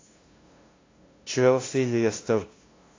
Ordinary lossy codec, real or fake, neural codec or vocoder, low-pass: AAC, 32 kbps; fake; codec, 16 kHz, 0.5 kbps, FunCodec, trained on LibriTTS, 25 frames a second; 7.2 kHz